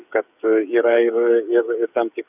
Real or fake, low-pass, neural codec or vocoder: fake; 3.6 kHz; codec, 16 kHz, 8 kbps, FreqCodec, smaller model